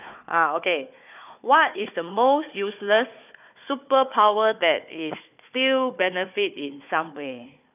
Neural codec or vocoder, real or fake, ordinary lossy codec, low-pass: codec, 16 kHz, 4 kbps, FunCodec, trained on Chinese and English, 50 frames a second; fake; none; 3.6 kHz